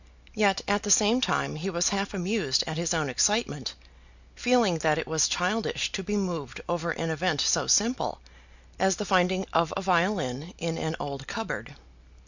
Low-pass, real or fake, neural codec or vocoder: 7.2 kHz; real; none